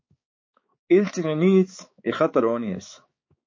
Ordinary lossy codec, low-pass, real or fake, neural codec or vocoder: MP3, 32 kbps; 7.2 kHz; fake; codec, 16 kHz, 4 kbps, X-Codec, HuBERT features, trained on balanced general audio